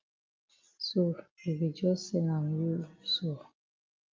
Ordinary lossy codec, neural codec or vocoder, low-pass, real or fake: Opus, 32 kbps; none; 7.2 kHz; real